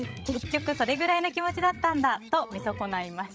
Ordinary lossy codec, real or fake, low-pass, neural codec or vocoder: none; fake; none; codec, 16 kHz, 16 kbps, FreqCodec, larger model